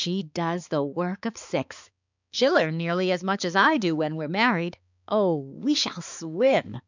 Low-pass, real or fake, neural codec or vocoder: 7.2 kHz; fake; codec, 16 kHz, 4 kbps, X-Codec, HuBERT features, trained on balanced general audio